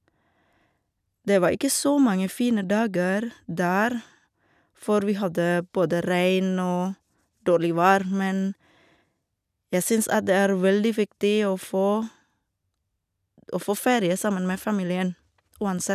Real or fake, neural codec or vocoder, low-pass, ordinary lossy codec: real; none; 14.4 kHz; none